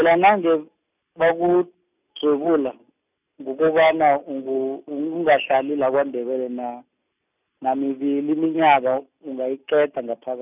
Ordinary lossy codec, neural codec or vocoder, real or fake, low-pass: none; none; real; 3.6 kHz